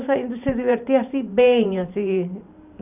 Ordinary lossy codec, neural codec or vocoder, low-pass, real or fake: none; vocoder, 44.1 kHz, 128 mel bands every 512 samples, BigVGAN v2; 3.6 kHz; fake